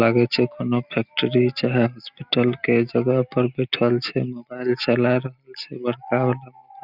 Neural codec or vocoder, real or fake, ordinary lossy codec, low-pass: none; real; none; 5.4 kHz